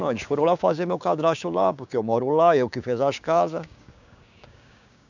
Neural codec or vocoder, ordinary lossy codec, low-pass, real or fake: codec, 16 kHz, 6 kbps, DAC; none; 7.2 kHz; fake